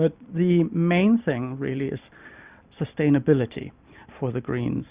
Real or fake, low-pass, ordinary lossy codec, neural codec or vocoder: real; 3.6 kHz; Opus, 64 kbps; none